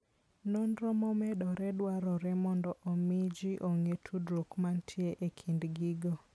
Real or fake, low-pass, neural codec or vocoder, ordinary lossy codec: real; none; none; none